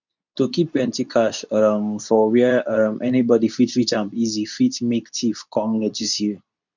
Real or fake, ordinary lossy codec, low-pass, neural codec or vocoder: fake; none; 7.2 kHz; codec, 24 kHz, 0.9 kbps, WavTokenizer, medium speech release version 2